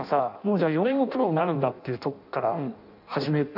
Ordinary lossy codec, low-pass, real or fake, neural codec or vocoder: none; 5.4 kHz; fake; codec, 16 kHz in and 24 kHz out, 0.6 kbps, FireRedTTS-2 codec